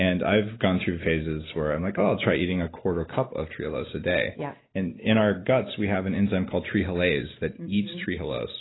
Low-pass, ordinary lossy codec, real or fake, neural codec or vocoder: 7.2 kHz; AAC, 16 kbps; real; none